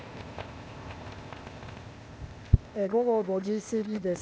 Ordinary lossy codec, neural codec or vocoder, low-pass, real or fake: none; codec, 16 kHz, 0.8 kbps, ZipCodec; none; fake